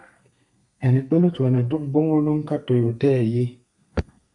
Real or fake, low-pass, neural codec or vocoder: fake; 10.8 kHz; codec, 32 kHz, 1.9 kbps, SNAC